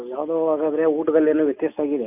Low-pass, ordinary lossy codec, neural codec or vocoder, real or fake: 3.6 kHz; none; none; real